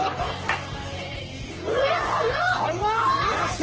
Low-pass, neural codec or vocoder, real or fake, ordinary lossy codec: 7.2 kHz; codec, 44.1 kHz, 3.4 kbps, Pupu-Codec; fake; Opus, 16 kbps